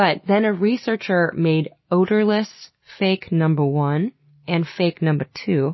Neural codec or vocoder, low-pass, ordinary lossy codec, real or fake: none; 7.2 kHz; MP3, 24 kbps; real